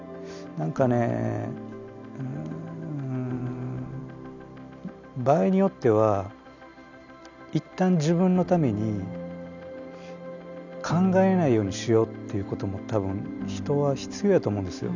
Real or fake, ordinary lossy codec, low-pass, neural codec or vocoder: real; none; 7.2 kHz; none